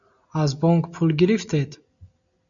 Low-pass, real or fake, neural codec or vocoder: 7.2 kHz; real; none